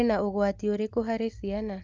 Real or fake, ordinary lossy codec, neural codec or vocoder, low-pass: real; Opus, 24 kbps; none; 7.2 kHz